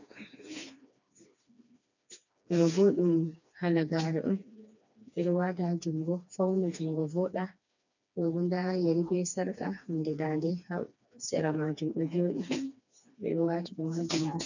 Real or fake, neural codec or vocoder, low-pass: fake; codec, 16 kHz, 2 kbps, FreqCodec, smaller model; 7.2 kHz